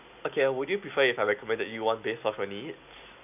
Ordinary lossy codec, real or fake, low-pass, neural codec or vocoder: none; real; 3.6 kHz; none